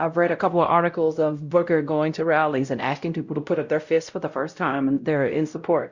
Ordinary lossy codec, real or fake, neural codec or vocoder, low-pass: Opus, 64 kbps; fake; codec, 16 kHz, 0.5 kbps, X-Codec, WavLM features, trained on Multilingual LibriSpeech; 7.2 kHz